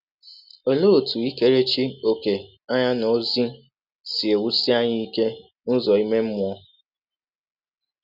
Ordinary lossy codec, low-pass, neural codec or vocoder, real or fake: none; 5.4 kHz; none; real